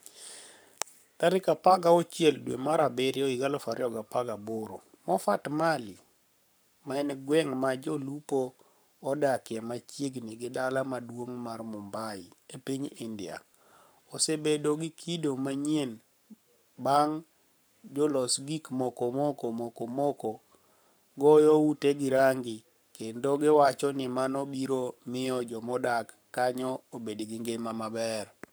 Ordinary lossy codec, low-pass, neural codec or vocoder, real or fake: none; none; codec, 44.1 kHz, 7.8 kbps, Pupu-Codec; fake